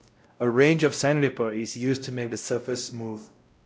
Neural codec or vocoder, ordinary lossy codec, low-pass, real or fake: codec, 16 kHz, 0.5 kbps, X-Codec, WavLM features, trained on Multilingual LibriSpeech; none; none; fake